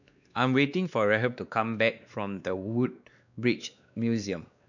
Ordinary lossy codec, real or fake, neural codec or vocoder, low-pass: none; fake; codec, 16 kHz, 2 kbps, X-Codec, WavLM features, trained on Multilingual LibriSpeech; 7.2 kHz